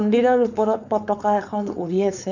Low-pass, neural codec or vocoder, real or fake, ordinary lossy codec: 7.2 kHz; codec, 16 kHz, 4.8 kbps, FACodec; fake; none